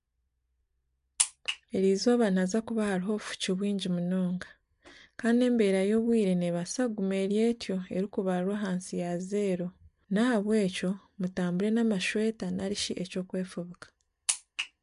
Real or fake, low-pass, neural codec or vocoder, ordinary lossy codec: real; 10.8 kHz; none; MP3, 64 kbps